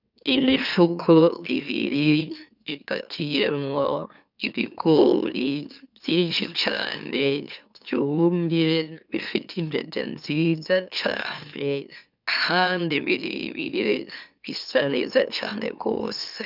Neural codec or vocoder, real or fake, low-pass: autoencoder, 44.1 kHz, a latent of 192 numbers a frame, MeloTTS; fake; 5.4 kHz